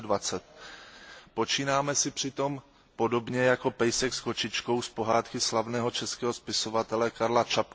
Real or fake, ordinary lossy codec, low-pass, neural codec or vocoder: real; none; none; none